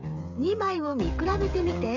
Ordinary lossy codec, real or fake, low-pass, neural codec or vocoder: none; fake; 7.2 kHz; codec, 16 kHz, 16 kbps, FreqCodec, smaller model